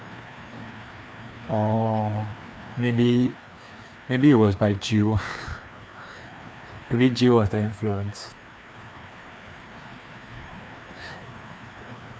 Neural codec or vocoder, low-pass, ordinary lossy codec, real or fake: codec, 16 kHz, 2 kbps, FreqCodec, larger model; none; none; fake